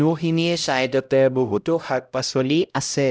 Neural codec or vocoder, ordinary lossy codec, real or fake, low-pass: codec, 16 kHz, 0.5 kbps, X-Codec, HuBERT features, trained on LibriSpeech; none; fake; none